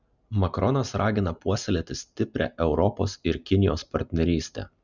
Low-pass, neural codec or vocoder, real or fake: 7.2 kHz; none; real